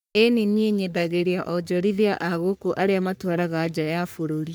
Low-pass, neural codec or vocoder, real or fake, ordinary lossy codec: none; codec, 44.1 kHz, 3.4 kbps, Pupu-Codec; fake; none